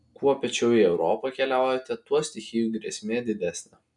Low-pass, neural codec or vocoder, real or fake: 10.8 kHz; none; real